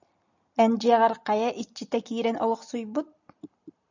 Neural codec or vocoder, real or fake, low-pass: none; real; 7.2 kHz